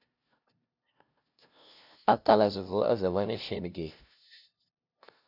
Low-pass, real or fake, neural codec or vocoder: 5.4 kHz; fake; codec, 16 kHz, 0.5 kbps, FunCodec, trained on LibriTTS, 25 frames a second